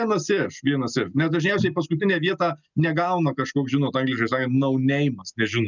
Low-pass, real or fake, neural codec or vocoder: 7.2 kHz; real; none